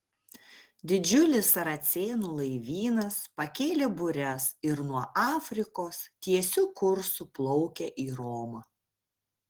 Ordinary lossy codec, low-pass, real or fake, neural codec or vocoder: Opus, 16 kbps; 19.8 kHz; real; none